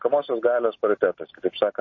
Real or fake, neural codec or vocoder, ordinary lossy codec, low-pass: real; none; MP3, 32 kbps; 7.2 kHz